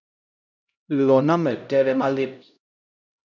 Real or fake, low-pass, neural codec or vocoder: fake; 7.2 kHz; codec, 16 kHz, 0.5 kbps, X-Codec, HuBERT features, trained on LibriSpeech